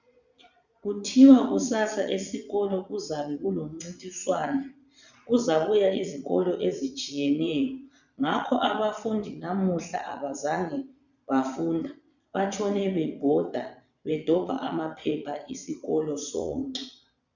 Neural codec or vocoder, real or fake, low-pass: vocoder, 22.05 kHz, 80 mel bands, Vocos; fake; 7.2 kHz